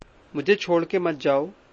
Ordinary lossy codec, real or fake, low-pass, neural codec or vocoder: MP3, 32 kbps; real; 9.9 kHz; none